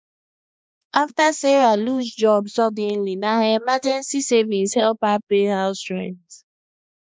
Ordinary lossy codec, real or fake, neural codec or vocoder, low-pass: none; fake; codec, 16 kHz, 2 kbps, X-Codec, HuBERT features, trained on balanced general audio; none